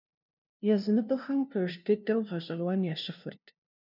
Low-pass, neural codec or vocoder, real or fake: 5.4 kHz; codec, 16 kHz, 0.5 kbps, FunCodec, trained on LibriTTS, 25 frames a second; fake